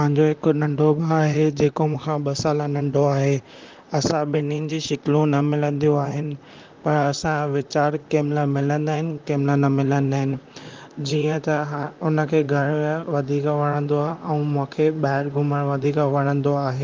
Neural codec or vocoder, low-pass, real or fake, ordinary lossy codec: vocoder, 44.1 kHz, 128 mel bands, Pupu-Vocoder; 7.2 kHz; fake; Opus, 24 kbps